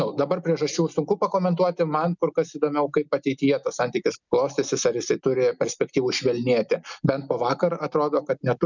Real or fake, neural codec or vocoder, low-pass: real; none; 7.2 kHz